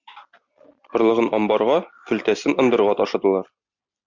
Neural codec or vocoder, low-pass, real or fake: none; 7.2 kHz; real